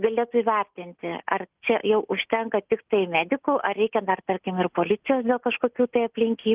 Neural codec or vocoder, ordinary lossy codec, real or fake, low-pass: none; Opus, 24 kbps; real; 3.6 kHz